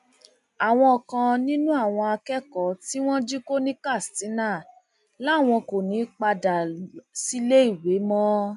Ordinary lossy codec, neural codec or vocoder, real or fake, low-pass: none; none; real; 10.8 kHz